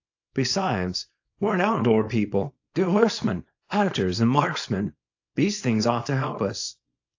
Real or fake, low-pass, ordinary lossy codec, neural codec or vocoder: fake; 7.2 kHz; AAC, 48 kbps; codec, 24 kHz, 0.9 kbps, WavTokenizer, small release